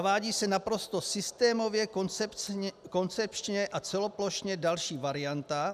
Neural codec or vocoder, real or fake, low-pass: none; real; 14.4 kHz